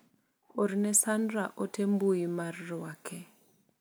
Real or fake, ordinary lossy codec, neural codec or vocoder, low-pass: real; none; none; none